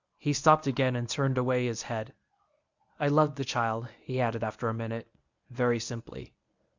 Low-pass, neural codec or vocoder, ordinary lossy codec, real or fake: 7.2 kHz; codec, 24 kHz, 0.9 kbps, WavTokenizer, medium speech release version 1; Opus, 64 kbps; fake